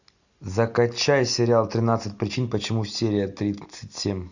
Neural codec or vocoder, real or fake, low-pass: none; real; 7.2 kHz